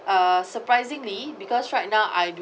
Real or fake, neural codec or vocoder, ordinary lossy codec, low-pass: real; none; none; none